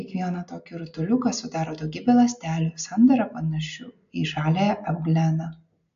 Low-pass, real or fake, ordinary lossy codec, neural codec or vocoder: 7.2 kHz; real; AAC, 64 kbps; none